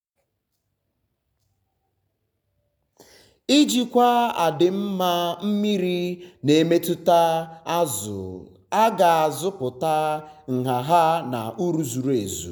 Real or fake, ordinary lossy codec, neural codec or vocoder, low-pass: real; none; none; none